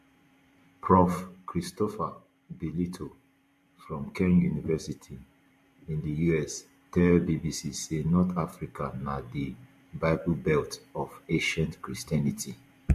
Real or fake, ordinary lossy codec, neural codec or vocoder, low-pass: fake; MP3, 64 kbps; vocoder, 44.1 kHz, 128 mel bands every 512 samples, BigVGAN v2; 14.4 kHz